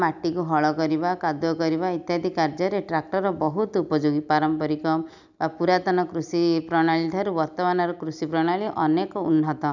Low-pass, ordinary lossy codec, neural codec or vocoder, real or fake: 7.2 kHz; none; none; real